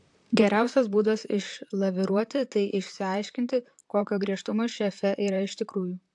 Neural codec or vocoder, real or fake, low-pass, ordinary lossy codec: vocoder, 44.1 kHz, 128 mel bands, Pupu-Vocoder; fake; 10.8 kHz; AAC, 64 kbps